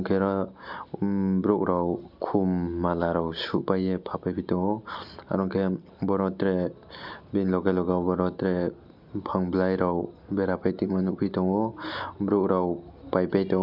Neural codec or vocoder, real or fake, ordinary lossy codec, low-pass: none; real; MP3, 48 kbps; 5.4 kHz